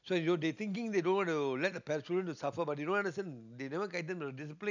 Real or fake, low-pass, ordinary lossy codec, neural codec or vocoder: real; 7.2 kHz; none; none